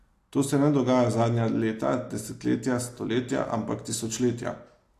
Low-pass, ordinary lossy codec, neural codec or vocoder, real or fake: 14.4 kHz; AAC, 64 kbps; none; real